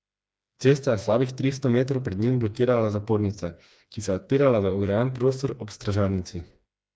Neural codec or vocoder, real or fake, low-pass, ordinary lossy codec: codec, 16 kHz, 2 kbps, FreqCodec, smaller model; fake; none; none